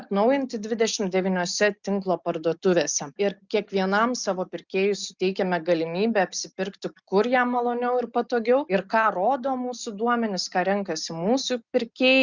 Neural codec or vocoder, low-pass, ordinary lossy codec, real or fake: none; 7.2 kHz; Opus, 64 kbps; real